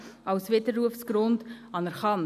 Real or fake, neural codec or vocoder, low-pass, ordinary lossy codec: real; none; 14.4 kHz; none